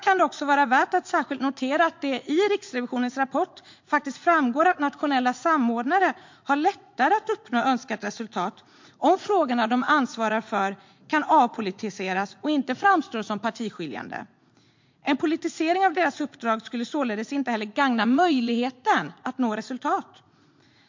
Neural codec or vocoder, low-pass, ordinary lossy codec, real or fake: vocoder, 44.1 kHz, 80 mel bands, Vocos; 7.2 kHz; MP3, 48 kbps; fake